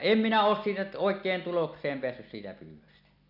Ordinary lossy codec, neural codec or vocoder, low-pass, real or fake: none; none; 5.4 kHz; real